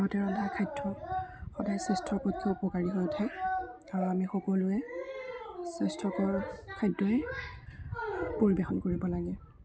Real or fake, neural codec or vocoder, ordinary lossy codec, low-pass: real; none; none; none